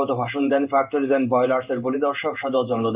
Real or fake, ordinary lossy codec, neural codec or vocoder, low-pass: fake; Opus, 24 kbps; codec, 16 kHz in and 24 kHz out, 1 kbps, XY-Tokenizer; 3.6 kHz